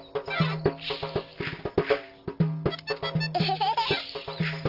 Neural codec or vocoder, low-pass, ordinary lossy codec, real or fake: none; 5.4 kHz; Opus, 32 kbps; real